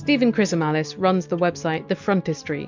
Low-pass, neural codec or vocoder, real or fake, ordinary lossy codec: 7.2 kHz; none; real; MP3, 64 kbps